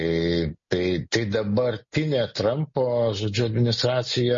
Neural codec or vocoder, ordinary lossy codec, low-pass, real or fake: none; MP3, 32 kbps; 7.2 kHz; real